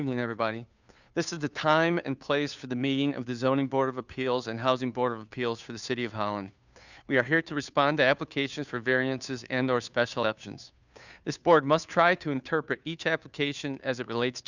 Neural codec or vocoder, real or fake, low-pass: codec, 16 kHz, 2 kbps, FunCodec, trained on Chinese and English, 25 frames a second; fake; 7.2 kHz